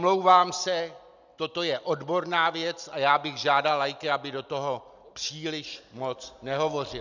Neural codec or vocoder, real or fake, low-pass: none; real; 7.2 kHz